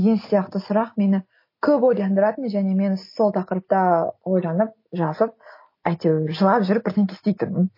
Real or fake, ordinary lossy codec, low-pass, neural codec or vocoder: real; MP3, 24 kbps; 5.4 kHz; none